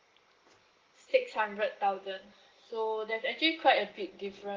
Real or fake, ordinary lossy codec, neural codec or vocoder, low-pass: real; Opus, 16 kbps; none; 7.2 kHz